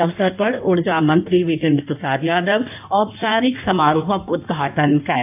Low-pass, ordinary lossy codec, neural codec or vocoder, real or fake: 3.6 kHz; AAC, 32 kbps; codec, 16 kHz in and 24 kHz out, 1.1 kbps, FireRedTTS-2 codec; fake